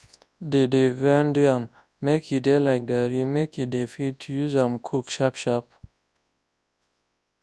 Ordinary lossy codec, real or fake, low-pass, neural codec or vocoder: none; fake; none; codec, 24 kHz, 0.9 kbps, WavTokenizer, large speech release